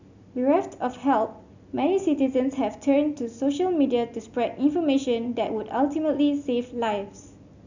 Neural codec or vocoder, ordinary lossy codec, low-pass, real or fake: none; none; 7.2 kHz; real